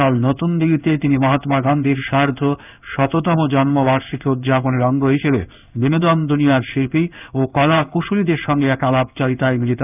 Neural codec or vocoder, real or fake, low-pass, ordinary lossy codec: codec, 16 kHz in and 24 kHz out, 1 kbps, XY-Tokenizer; fake; 3.6 kHz; none